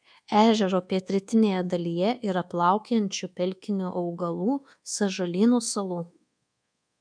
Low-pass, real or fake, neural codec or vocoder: 9.9 kHz; fake; codec, 24 kHz, 1.2 kbps, DualCodec